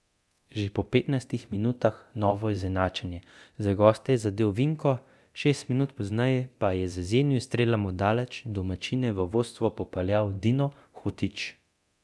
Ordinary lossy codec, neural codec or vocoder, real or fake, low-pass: none; codec, 24 kHz, 0.9 kbps, DualCodec; fake; none